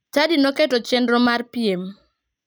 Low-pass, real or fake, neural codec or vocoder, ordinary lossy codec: none; real; none; none